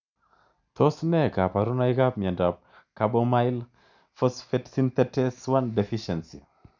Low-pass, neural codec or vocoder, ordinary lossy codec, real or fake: 7.2 kHz; none; none; real